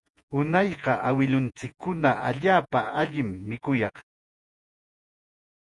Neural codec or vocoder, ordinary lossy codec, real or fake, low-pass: vocoder, 48 kHz, 128 mel bands, Vocos; MP3, 96 kbps; fake; 10.8 kHz